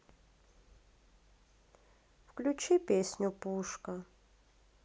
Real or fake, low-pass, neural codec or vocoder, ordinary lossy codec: real; none; none; none